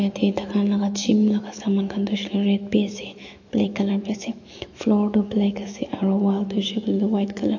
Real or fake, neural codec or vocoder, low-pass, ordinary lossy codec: fake; autoencoder, 48 kHz, 128 numbers a frame, DAC-VAE, trained on Japanese speech; 7.2 kHz; AAC, 48 kbps